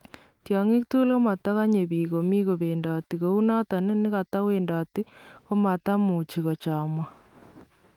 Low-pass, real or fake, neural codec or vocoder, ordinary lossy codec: 19.8 kHz; fake; autoencoder, 48 kHz, 128 numbers a frame, DAC-VAE, trained on Japanese speech; Opus, 32 kbps